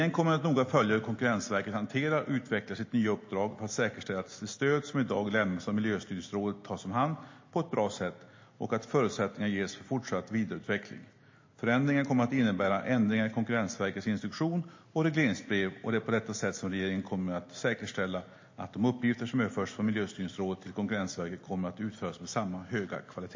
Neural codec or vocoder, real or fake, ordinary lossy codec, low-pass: none; real; MP3, 32 kbps; 7.2 kHz